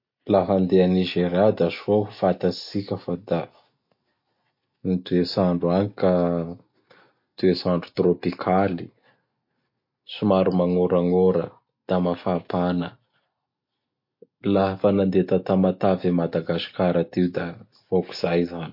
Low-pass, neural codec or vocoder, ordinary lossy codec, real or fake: 5.4 kHz; none; MP3, 32 kbps; real